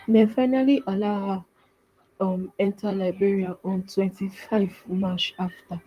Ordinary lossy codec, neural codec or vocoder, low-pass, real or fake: Opus, 32 kbps; vocoder, 44.1 kHz, 128 mel bands, Pupu-Vocoder; 14.4 kHz; fake